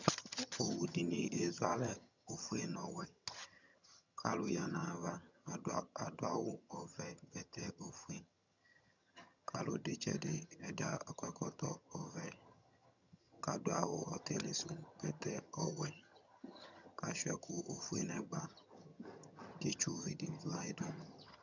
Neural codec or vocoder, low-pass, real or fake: vocoder, 22.05 kHz, 80 mel bands, HiFi-GAN; 7.2 kHz; fake